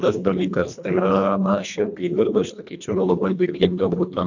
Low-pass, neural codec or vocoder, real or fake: 7.2 kHz; codec, 24 kHz, 1.5 kbps, HILCodec; fake